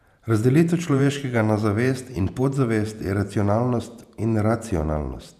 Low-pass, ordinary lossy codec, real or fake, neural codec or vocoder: 14.4 kHz; none; fake; vocoder, 48 kHz, 128 mel bands, Vocos